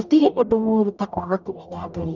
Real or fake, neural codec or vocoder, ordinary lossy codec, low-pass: fake; codec, 44.1 kHz, 0.9 kbps, DAC; none; 7.2 kHz